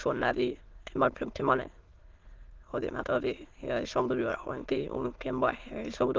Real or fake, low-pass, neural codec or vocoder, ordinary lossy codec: fake; 7.2 kHz; autoencoder, 22.05 kHz, a latent of 192 numbers a frame, VITS, trained on many speakers; Opus, 16 kbps